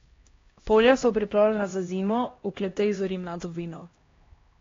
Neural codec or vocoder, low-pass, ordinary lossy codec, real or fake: codec, 16 kHz, 1 kbps, X-Codec, HuBERT features, trained on LibriSpeech; 7.2 kHz; AAC, 32 kbps; fake